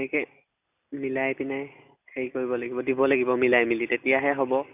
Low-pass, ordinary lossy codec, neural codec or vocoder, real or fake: 3.6 kHz; none; none; real